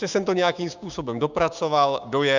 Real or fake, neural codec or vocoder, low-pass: fake; codec, 16 kHz, 6 kbps, DAC; 7.2 kHz